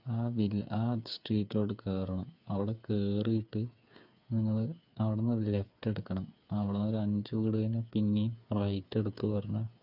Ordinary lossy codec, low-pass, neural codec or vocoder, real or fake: none; 5.4 kHz; codec, 16 kHz, 8 kbps, FreqCodec, smaller model; fake